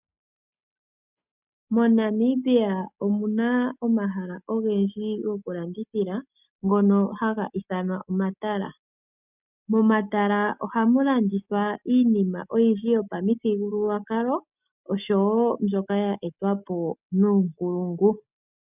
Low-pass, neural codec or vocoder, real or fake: 3.6 kHz; none; real